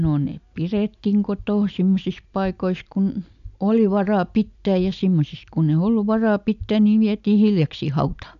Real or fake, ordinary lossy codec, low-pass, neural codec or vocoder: real; AAC, 96 kbps; 7.2 kHz; none